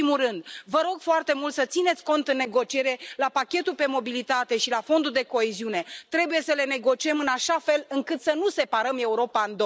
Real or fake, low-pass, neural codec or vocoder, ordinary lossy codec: real; none; none; none